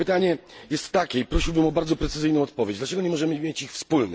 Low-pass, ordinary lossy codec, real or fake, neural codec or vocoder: none; none; real; none